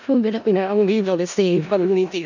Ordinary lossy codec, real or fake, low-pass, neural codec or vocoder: none; fake; 7.2 kHz; codec, 16 kHz in and 24 kHz out, 0.4 kbps, LongCat-Audio-Codec, four codebook decoder